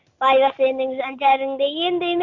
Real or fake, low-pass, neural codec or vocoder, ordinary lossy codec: real; 7.2 kHz; none; Opus, 64 kbps